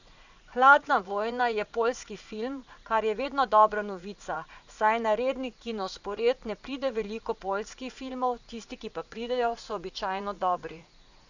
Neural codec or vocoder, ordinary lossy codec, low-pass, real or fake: vocoder, 44.1 kHz, 80 mel bands, Vocos; none; 7.2 kHz; fake